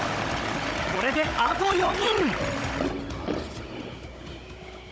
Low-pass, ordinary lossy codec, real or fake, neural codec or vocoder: none; none; fake; codec, 16 kHz, 16 kbps, FunCodec, trained on Chinese and English, 50 frames a second